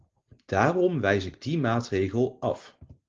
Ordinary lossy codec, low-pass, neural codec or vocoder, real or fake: Opus, 16 kbps; 7.2 kHz; none; real